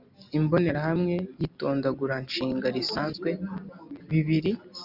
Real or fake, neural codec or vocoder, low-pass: real; none; 5.4 kHz